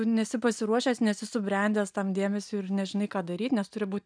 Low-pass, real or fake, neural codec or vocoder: 9.9 kHz; real; none